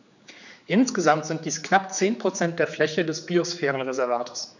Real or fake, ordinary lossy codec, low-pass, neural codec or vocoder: fake; none; 7.2 kHz; codec, 16 kHz, 4 kbps, X-Codec, HuBERT features, trained on general audio